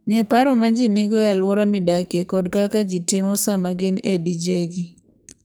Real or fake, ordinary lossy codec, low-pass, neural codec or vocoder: fake; none; none; codec, 44.1 kHz, 2.6 kbps, SNAC